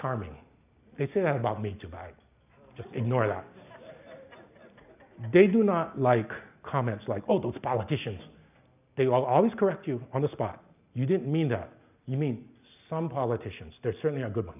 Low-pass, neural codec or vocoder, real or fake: 3.6 kHz; none; real